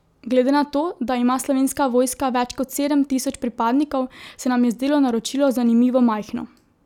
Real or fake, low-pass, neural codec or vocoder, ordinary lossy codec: real; 19.8 kHz; none; none